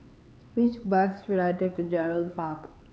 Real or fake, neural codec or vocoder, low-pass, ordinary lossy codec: fake; codec, 16 kHz, 4 kbps, X-Codec, HuBERT features, trained on LibriSpeech; none; none